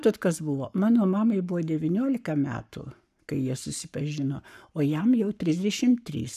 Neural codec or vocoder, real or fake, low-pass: codec, 44.1 kHz, 7.8 kbps, Pupu-Codec; fake; 14.4 kHz